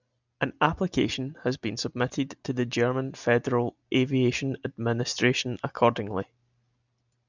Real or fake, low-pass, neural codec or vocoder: real; 7.2 kHz; none